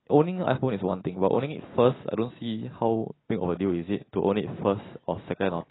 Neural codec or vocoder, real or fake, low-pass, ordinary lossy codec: none; real; 7.2 kHz; AAC, 16 kbps